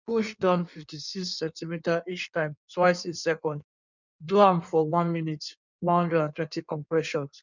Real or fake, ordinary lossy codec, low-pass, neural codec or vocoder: fake; none; 7.2 kHz; codec, 16 kHz in and 24 kHz out, 1.1 kbps, FireRedTTS-2 codec